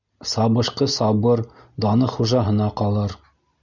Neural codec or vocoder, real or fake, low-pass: none; real; 7.2 kHz